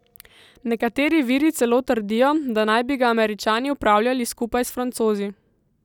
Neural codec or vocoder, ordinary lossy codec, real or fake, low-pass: none; none; real; 19.8 kHz